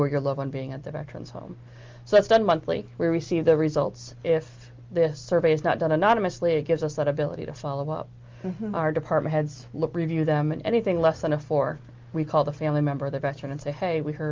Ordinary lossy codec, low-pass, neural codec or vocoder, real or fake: Opus, 32 kbps; 7.2 kHz; none; real